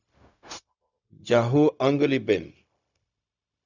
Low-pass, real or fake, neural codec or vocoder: 7.2 kHz; fake; codec, 16 kHz, 0.4 kbps, LongCat-Audio-Codec